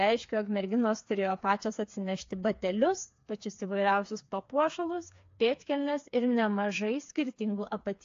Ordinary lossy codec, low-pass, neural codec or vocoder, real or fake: AAC, 48 kbps; 7.2 kHz; codec, 16 kHz, 4 kbps, FreqCodec, smaller model; fake